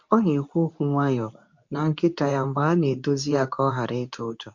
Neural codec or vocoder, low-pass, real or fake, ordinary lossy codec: codec, 24 kHz, 0.9 kbps, WavTokenizer, medium speech release version 2; 7.2 kHz; fake; MP3, 48 kbps